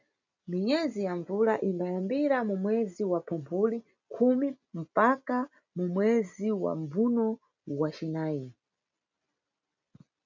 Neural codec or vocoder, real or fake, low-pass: none; real; 7.2 kHz